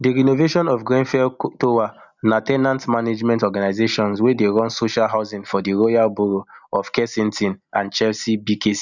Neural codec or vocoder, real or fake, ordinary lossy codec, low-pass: none; real; none; 7.2 kHz